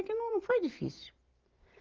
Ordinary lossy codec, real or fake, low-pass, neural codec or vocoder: Opus, 16 kbps; fake; 7.2 kHz; vocoder, 44.1 kHz, 128 mel bands every 512 samples, BigVGAN v2